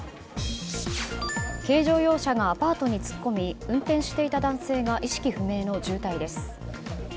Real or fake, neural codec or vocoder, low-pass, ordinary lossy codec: real; none; none; none